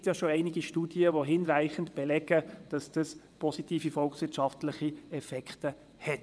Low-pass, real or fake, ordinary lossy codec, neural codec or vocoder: none; fake; none; vocoder, 22.05 kHz, 80 mel bands, WaveNeXt